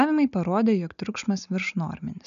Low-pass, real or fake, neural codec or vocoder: 7.2 kHz; real; none